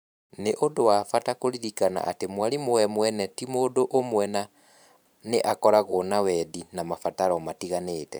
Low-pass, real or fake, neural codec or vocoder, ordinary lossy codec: none; fake; vocoder, 44.1 kHz, 128 mel bands every 512 samples, BigVGAN v2; none